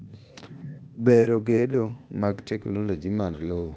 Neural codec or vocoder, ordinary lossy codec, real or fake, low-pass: codec, 16 kHz, 0.8 kbps, ZipCodec; none; fake; none